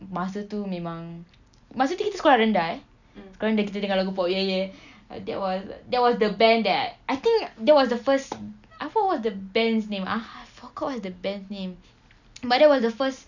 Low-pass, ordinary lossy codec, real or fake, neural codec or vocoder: 7.2 kHz; none; real; none